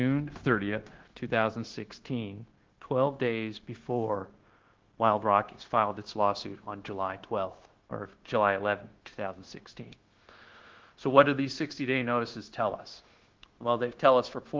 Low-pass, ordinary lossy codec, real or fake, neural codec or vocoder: 7.2 kHz; Opus, 16 kbps; fake; codec, 16 kHz, 0.9 kbps, LongCat-Audio-Codec